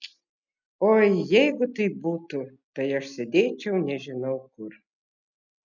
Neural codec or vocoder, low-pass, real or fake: none; 7.2 kHz; real